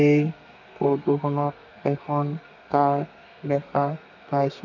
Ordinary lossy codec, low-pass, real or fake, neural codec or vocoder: none; 7.2 kHz; fake; codec, 32 kHz, 1.9 kbps, SNAC